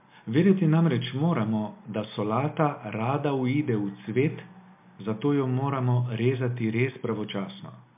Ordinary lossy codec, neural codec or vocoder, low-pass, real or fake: MP3, 32 kbps; none; 3.6 kHz; real